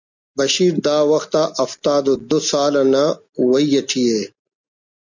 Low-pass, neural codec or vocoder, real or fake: 7.2 kHz; none; real